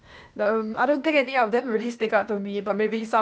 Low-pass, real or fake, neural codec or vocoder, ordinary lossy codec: none; fake; codec, 16 kHz, 0.8 kbps, ZipCodec; none